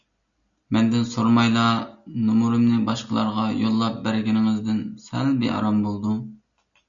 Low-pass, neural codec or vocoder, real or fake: 7.2 kHz; none; real